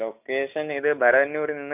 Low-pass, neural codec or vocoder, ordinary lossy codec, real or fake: 3.6 kHz; none; none; real